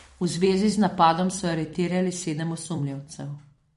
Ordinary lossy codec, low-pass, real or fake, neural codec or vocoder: MP3, 48 kbps; 14.4 kHz; fake; vocoder, 44.1 kHz, 128 mel bands every 256 samples, BigVGAN v2